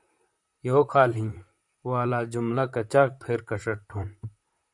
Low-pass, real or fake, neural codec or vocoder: 10.8 kHz; fake; vocoder, 44.1 kHz, 128 mel bands, Pupu-Vocoder